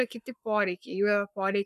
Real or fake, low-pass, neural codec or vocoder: fake; 14.4 kHz; codec, 44.1 kHz, 7.8 kbps, Pupu-Codec